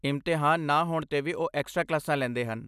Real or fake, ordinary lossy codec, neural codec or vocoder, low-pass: real; none; none; 14.4 kHz